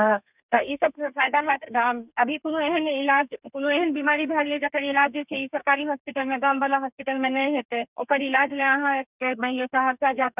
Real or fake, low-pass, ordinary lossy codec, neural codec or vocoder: fake; 3.6 kHz; none; codec, 32 kHz, 1.9 kbps, SNAC